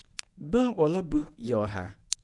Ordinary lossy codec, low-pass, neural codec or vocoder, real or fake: none; 10.8 kHz; codec, 24 kHz, 0.9 kbps, WavTokenizer, medium speech release version 1; fake